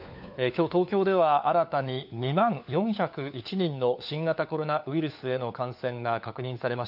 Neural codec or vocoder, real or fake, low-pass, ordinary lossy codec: codec, 16 kHz, 4 kbps, FunCodec, trained on LibriTTS, 50 frames a second; fake; 5.4 kHz; none